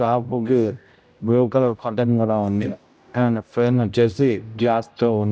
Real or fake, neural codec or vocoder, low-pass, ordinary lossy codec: fake; codec, 16 kHz, 0.5 kbps, X-Codec, HuBERT features, trained on general audio; none; none